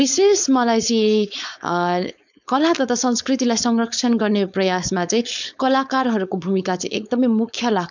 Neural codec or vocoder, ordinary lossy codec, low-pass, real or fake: codec, 16 kHz, 4.8 kbps, FACodec; none; 7.2 kHz; fake